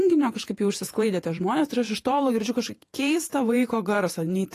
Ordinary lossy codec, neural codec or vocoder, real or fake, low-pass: AAC, 48 kbps; vocoder, 48 kHz, 128 mel bands, Vocos; fake; 14.4 kHz